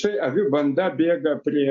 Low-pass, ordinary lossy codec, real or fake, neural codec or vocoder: 7.2 kHz; MP3, 48 kbps; real; none